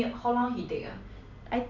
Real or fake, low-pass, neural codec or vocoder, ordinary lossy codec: real; 7.2 kHz; none; none